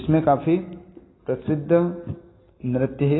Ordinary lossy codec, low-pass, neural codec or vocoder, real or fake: AAC, 16 kbps; 7.2 kHz; none; real